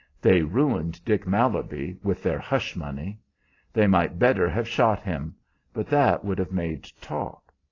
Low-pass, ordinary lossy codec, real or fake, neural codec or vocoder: 7.2 kHz; AAC, 32 kbps; real; none